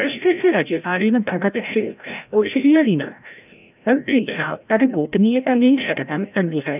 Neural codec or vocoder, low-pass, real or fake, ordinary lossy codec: codec, 16 kHz, 0.5 kbps, FreqCodec, larger model; 3.6 kHz; fake; none